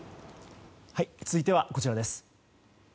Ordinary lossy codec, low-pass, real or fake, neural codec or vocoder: none; none; real; none